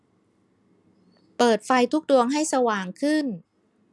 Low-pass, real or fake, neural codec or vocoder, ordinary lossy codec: none; real; none; none